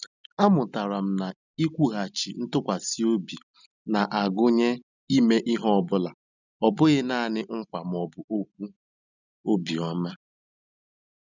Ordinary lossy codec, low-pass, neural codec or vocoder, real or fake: none; 7.2 kHz; none; real